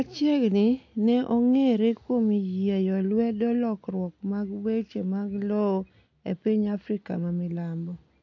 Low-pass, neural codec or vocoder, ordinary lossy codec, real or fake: 7.2 kHz; none; AAC, 48 kbps; real